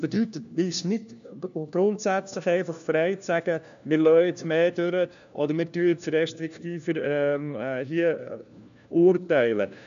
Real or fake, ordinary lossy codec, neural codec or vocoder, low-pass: fake; none; codec, 16 kHz, 1 kbps, FunCodec, trained on LibriTTS, 50 frames a second; 7.2 kHz